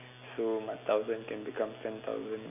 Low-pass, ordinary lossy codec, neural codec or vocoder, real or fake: 3.6 kHz; none; none; real